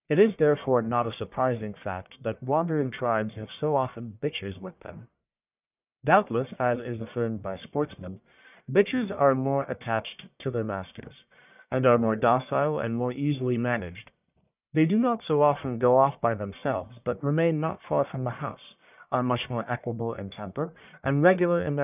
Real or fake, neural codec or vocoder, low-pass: fake; codec, 44.1 kHz, 1.7 kbps, Pupu-Codec; 3.6 kHz